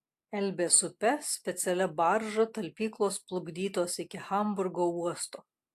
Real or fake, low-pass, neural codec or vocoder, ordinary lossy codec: real; 14.4 kHz; none; AAC, 64 kbps